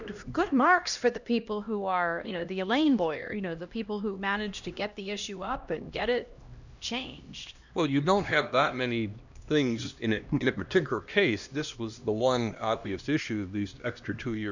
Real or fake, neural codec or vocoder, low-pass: fake; codec, 16 kHz, 1 kbps, X-Codec, HuBERT features, trained on LibriSpeech; 7.2 kHz